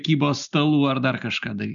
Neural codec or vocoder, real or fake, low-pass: none; real; 7.2 kHz